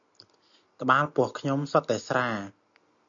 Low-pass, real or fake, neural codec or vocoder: 7.2 kHz; real; none